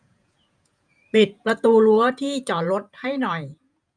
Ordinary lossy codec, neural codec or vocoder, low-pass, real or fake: none; vocoder, 48 kHz, 128 mel bands, Vocos; 9.9 kHz; fake